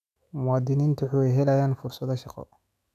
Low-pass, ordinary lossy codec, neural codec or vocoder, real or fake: 14.4 kHz; none; autoencoder, 48 kHz, 128 numbers a frame, DAC-VAE, trained on Japanese speech; fake